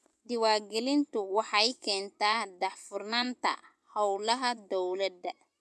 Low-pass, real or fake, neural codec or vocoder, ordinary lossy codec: none; fake; vocoder, 24 kHz, 100 mel bands, Vocos; none